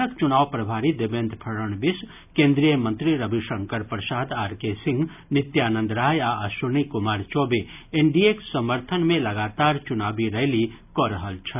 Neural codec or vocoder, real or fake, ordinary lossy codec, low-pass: none; real; none; 3.6 kHz